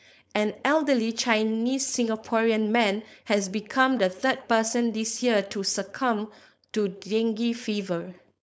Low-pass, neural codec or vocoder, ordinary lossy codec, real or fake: none; codec, 16 kHz, 4.8 kbps, FACodec; none; fake